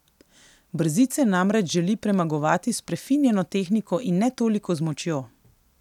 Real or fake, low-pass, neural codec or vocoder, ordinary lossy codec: real; 19.8 kHz; none; none